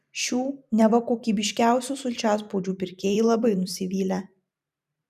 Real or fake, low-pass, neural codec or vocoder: fake; 14.4 kHz; vocoder, 44.1 kHz, 128 mel bands every 512 samples, BigVGAN v2